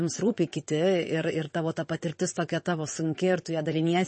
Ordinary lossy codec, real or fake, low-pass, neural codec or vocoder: MP3, 32 kbps; fake; 9.9 kHz; vocoder, 22.05 kHz, 80 mel bands, WaveNeXt